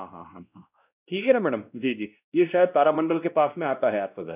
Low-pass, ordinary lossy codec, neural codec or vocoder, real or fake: 3.6 kHz; none; codec, 16 kHz, 1 kbps, X-Codec, WavLM features, trained on Multilingual LibriSpeech; fake